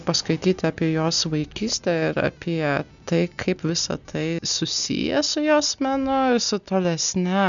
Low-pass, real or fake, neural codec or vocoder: 7.2 kHz; real; none